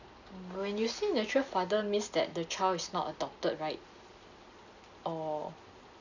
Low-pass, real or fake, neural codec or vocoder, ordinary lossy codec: 7.2 kHz; real; none; none